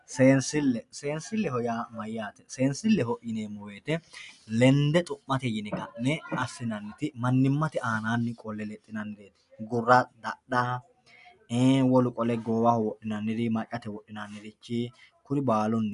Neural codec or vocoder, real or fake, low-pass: none; real; 10.8 kHz